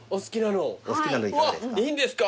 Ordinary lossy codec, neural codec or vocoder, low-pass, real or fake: none; none; none; real